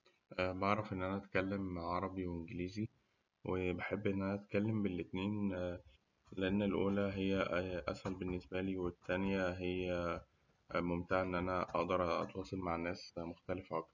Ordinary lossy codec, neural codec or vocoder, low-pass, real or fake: none; none; 7.2 kHz; real